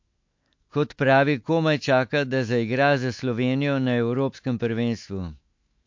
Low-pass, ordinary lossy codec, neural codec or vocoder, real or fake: 7.2 kHz; MP3, 48 kbps; autoencoder, 48 kHz, 128 numbers a frame, DAC-VAE, trained on Japanese speech; fake